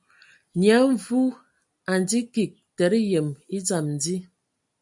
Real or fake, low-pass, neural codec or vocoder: real; 10.8 kHz; none